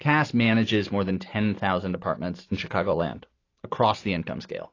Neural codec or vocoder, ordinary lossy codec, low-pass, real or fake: none; AAC, 32 kbps; 7.2 kHz; real